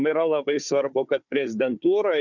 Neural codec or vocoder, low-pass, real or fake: codec, 16 kHz, 4 kbps, FunCodec, trained on Chinese and English, 50 frames a second; 7.2 kHz; fake